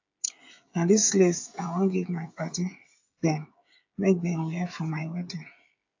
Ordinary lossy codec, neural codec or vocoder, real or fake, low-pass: AAC, 48 kbps; codec, 16 kHz, 16 kbps, FreqCodec, smaller model; fake; 7.2 kHz